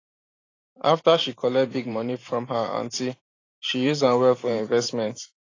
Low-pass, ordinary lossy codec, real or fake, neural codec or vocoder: 7.2 kHz; AAC, 32 kbps; fake; vocoder, 44.1 kHz, 128 mel bands every 512 samples, BigVGAN v2